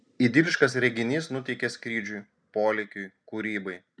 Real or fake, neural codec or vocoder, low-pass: real; none; 9.9 kHz